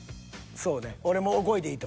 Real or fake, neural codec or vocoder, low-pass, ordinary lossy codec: real; none; none; none